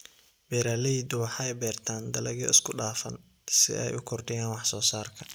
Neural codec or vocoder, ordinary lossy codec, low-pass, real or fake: none; none; none; real